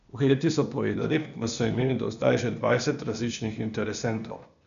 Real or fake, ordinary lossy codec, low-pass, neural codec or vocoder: fake; none; 7.2 kHz; codec, 16 kHz, 0.8 kbps, ZipCodec